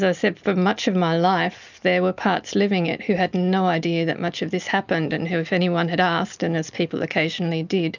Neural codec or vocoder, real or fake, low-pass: none; real; 7.2 kHz